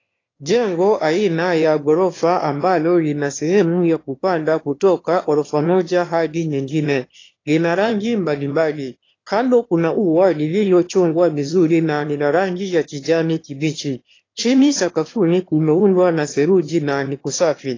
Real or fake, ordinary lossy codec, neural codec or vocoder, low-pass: fake; AAC, 32 kbps; autoencoder, 22.05 kHz, a latent of 192 numbers a frame, VITS, trained on one speaker; 7.2 kHz